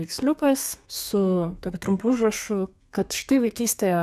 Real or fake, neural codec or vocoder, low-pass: fake; codec, 32 kHz, 1.9 kbps, SNAC; 14.4 kHz